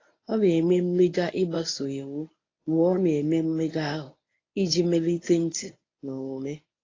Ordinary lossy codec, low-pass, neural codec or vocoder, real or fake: AAC, 32 kbps; 7.2 kHz; codec, 24 kHz, 0.9 kbps, WavTokenizer, medium speech release version 1; fake